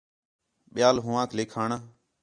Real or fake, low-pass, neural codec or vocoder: real; 9.9 kHz; none